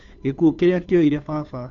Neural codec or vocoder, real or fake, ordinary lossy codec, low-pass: codec, 16 kHz, 2 kbps, FunCodec, trained on Chinese and English, 25 frames a second; fake; MP3, 64 kbps; 7.2 kHz